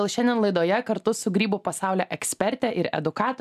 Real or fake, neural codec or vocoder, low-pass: real; none; 14.4 kHz